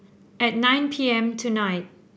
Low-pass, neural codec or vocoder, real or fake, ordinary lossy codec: none; none; real; none